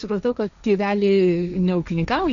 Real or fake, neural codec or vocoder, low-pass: fake; codec, 16 kHz, 1.1 kbps, Voila-Tokenizer; 7.2 kHz